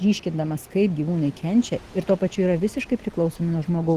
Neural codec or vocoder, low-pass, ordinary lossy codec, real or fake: none; 14.4 kHz; Opus, 16 kbps; real